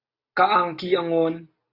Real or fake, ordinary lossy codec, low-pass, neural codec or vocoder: real; AAC, 32 kbps; 5.4 kHz; none